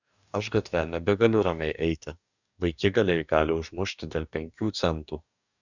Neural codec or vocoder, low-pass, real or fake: codec, 44.1 kHz, 2.6 kbps, DAC; 7.2 kHz; fake